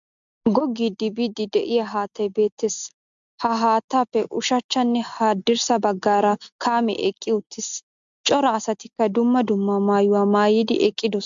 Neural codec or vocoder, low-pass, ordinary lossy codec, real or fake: none; 7.2 kHz; MP3, 64 kbps; real